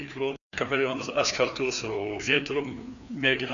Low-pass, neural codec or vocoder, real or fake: 7.2 kHz; codec, 16 kHz, 2 kbps, FreqCodec, larger model; fake